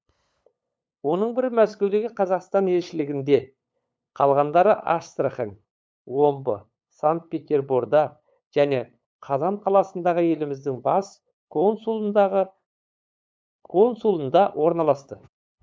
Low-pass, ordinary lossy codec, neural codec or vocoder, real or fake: none; none; codec, 16 kHz, 8 kbps, FunCodec, trained on LibriTTS, 25 frames a second; fake